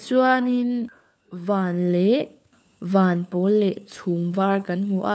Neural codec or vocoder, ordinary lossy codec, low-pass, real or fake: codec, 16 kHz, 4 kbps, FunCodec, trained on LibriTTS, 50 frames a second; none; none; fake